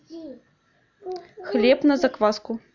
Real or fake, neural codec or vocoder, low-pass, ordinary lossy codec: real; none; 7.2 kHz; none